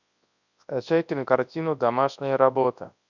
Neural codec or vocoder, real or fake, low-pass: codec, 24 kHz, 0.9 kbps, WavTokenizer, large speech release; fake; 7.2 kHz